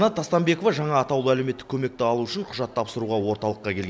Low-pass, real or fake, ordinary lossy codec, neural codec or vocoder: none; real; none; none